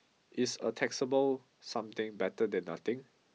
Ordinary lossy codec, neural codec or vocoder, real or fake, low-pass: none; none; real; none